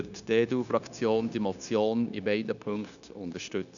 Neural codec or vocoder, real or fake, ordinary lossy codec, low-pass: codec, 16 kHz, 0.9 kbps, LongCat-Audio-Codec; fake; none; 7.2 kHz